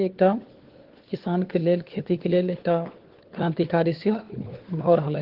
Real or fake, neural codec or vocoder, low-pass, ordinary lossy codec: fake; codec, 16 kHz, 4 kbps, FunCodec, trained on LibriTTS, 50 frames a second; 5.4 kHz; Opus, 16 kbps